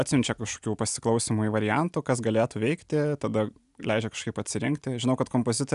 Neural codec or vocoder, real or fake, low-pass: none; real; 10.8 kHz